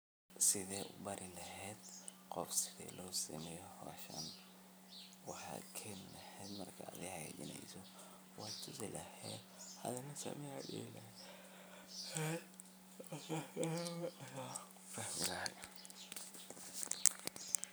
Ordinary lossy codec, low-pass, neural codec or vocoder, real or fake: none; none; vocoder, 44.1 kHz, 128 mel bands every 256 samples, BigVGAN v2; fake